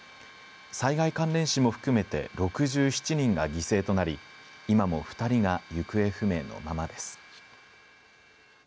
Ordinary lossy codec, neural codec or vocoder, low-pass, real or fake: none; none; none; real